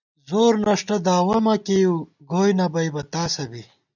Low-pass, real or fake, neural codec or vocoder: 7.2 kHz; real; none